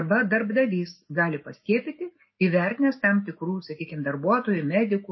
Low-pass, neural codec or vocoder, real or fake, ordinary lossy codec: 7.2 kHz; none; real; MP3, 24 kbps